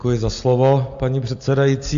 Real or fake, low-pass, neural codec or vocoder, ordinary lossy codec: real; 7.2 kHz; none; AAC, 64 kbps